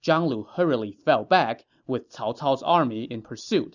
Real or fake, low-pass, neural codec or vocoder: real; 7.2 kHz; none